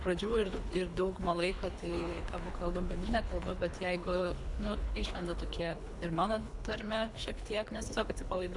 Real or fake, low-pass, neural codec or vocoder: fake; 10.8 kHz; codec, 24 kHz, 3 kbps, HILCodec